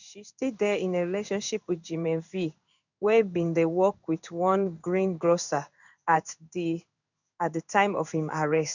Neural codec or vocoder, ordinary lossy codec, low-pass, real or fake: codec, 16 kHz in and 24 kHz out, 1 kbps, XY-Tokenizer; none; 7.2 kHz; fake